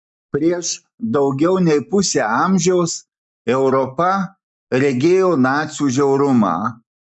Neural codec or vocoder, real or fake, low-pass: none; real; 9.9 kHz